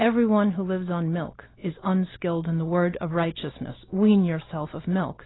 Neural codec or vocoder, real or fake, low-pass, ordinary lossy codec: none; real; 7.2 kHz; AAC, 16 kbps